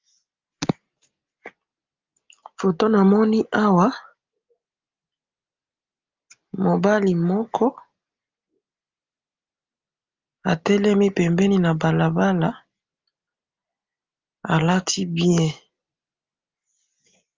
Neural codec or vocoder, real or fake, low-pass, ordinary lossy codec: none; real; 7.2 kHz; Opus, 32 kbps